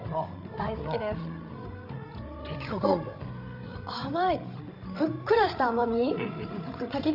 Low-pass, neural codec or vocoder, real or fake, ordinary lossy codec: 5.4 kHz; codec, 16 kHz, 16 kbps, FreqCodec, larger model; fake; AAC, 48 kbps